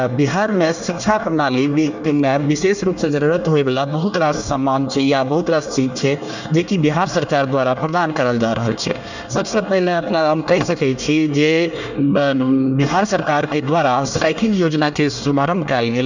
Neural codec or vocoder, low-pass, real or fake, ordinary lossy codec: codec, 24 kHz, 1 kbps, SNAC; 7.2 kHz; fake; none